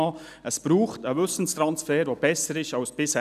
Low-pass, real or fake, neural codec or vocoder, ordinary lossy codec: 14.4 kHz; real; none; none